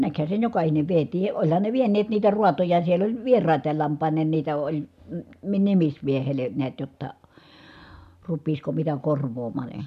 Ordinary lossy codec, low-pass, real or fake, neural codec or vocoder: none; 14.4 kHz; real; none